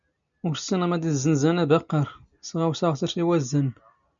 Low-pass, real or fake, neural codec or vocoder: 7.2 kHz; real; none